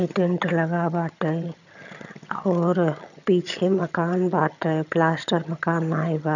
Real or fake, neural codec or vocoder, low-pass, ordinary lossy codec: fake; vocoder, 22.05 kHz, 80 mel bands, HiFi-GAN; 7.2 kHz; none